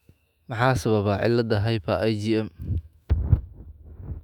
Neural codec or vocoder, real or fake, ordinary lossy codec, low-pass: autoencoder, 48 kHz, 128 numbers a frame, DAC-VAE, trained on Japanese speech; fake; none; 19.8 kHz